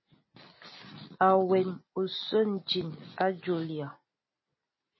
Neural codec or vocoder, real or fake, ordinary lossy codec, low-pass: none; real; MP3, 24 kbps; 7.2 kHz